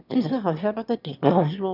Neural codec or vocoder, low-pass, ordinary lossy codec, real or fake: autoencoder, 22.05 kHz, a latent of 192 numbers a frame, VITS, trained on one speaker; 5.4 kHz; none; fake